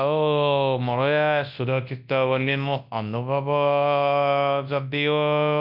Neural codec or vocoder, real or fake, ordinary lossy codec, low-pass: codec, 24 kHz, 0.9 kbps, WavTokenizer, large speech release; fake; none; 5.4 kHz